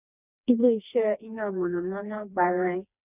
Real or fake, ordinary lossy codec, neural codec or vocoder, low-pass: fake; none; codec, 16 kHz, 2 kbps, FreqCodec, smaller model; 3.6 kHz